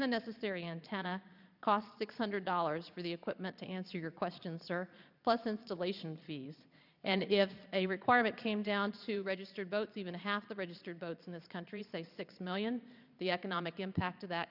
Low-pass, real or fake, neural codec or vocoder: 5.4 kHz; fake; vocoder, 22.05 kHz, 80 mel bands, Vocos